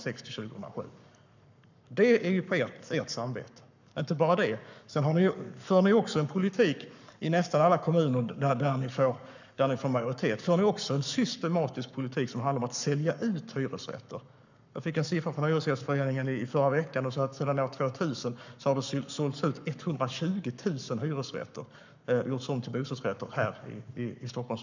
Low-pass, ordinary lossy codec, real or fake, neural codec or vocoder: 7.2 kHz; none; fake; codec, 44.1 kHz, 7.8 kbps, Pupu-Codec